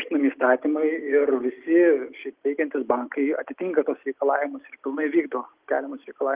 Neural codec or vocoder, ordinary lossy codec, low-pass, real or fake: none; Opus, 32 kbps; 3.6 kHz; real